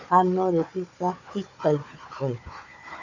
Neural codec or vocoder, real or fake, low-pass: codec, 16 kHz, 4 kbps, FunCodec, trained on Chinese and English, 50 frames a second; fake; 7.2 kHz